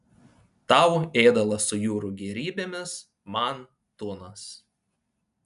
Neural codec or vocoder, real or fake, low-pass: none; real; 10.8 kHz